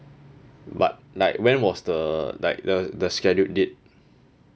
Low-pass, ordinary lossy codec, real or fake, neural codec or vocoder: none; none; real; none